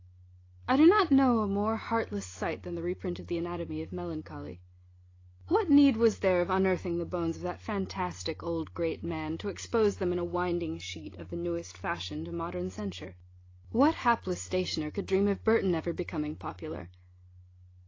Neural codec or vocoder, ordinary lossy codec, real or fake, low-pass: none; AAC, 32 kbps; real; 7.2 kHz